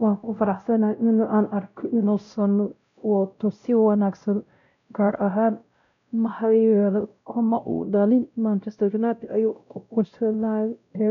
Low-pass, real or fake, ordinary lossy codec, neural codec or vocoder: 7.2 kHz; fake; none; codec, 16 kHz, 0.5 kbps, X-Codec, WavLM features, trained on Multilingual LibriSpeech